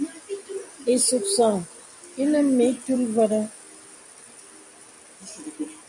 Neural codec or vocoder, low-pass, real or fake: none; 10.8 kHz; real